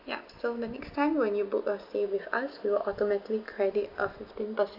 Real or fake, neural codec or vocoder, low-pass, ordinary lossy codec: real; none; 5.4 kHz; none